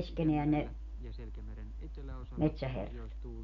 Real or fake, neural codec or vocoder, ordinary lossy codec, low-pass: real; none; none; 7.2 kHz